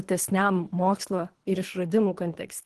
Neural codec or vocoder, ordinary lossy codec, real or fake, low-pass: codec, 24 kHz, 3 kbps, HILCodec; Opus, 16 kbps; fake; 10.8 kHz